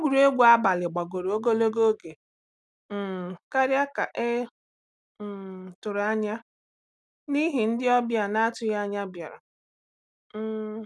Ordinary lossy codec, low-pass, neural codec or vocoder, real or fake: none; none; none; real